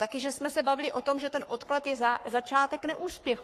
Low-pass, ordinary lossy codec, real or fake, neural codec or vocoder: 14.4 kHz; AAC, 48 kbps; fake; codec, 44.1 kHz, 3.4 kbps, Pupu-Codec